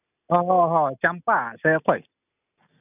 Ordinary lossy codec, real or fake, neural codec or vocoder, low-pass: none; real; none; 3.6 kHz